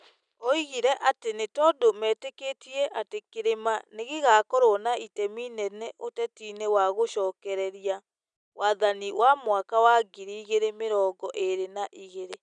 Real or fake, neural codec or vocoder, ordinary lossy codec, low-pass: real; none; none; 9.9 kHz